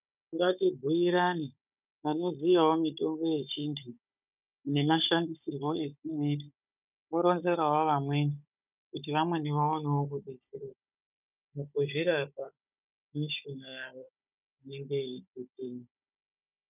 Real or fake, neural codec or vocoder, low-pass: fake; codec, 16 kHz, 16 kbps, FunCodec, trained on Chinese and English, 50 frames a second; 3.6 kHz